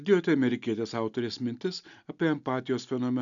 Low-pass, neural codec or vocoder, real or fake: 7.2 kHz; none; real